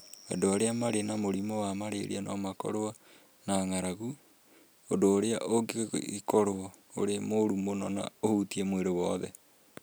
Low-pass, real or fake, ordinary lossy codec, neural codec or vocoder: none; real; none; none